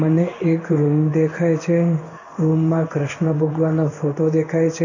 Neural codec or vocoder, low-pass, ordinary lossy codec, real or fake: codec, 16 kHz in and 24 kHz out, 1 kbps, XY-Tokenizer; 7.2 kHz; none; fake